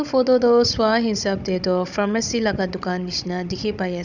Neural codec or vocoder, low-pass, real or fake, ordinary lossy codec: codec, 16 kHz, 16 kbps, FunCodec, trained on Chinese and English, 50 frames a second; 7.2 kHz; fake; none